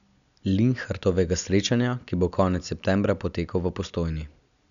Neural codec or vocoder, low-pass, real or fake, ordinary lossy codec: none; 7.2 kHz; real; MP3, 96 kbps